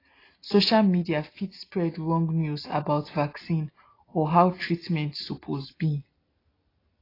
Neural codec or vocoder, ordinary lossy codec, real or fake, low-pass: none; AAC, 24 kbps; real; 5.4 kHz